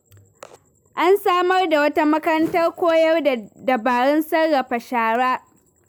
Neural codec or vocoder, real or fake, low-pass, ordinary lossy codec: none; real; none; none